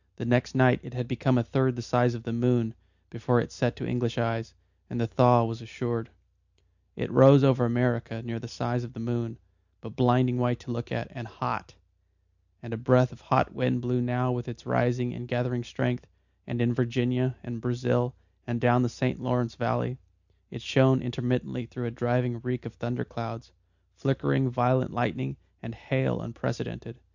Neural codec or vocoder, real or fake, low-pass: none; real; 7.2 kHz